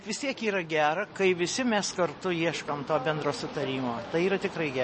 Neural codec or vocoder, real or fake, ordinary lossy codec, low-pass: none; real; MP3, 32 kbps; 10.8 kHz